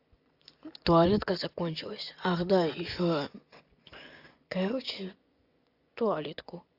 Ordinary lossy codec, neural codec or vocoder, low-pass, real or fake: AAC, 48 kbps; vocoder, 24 kHz, 100 mel bands, Vocos; 5.4 kHz; fake